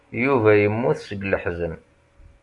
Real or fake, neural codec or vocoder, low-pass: real; none; 10.8 kHz